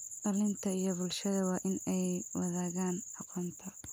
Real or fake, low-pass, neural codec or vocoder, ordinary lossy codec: real; none; none; none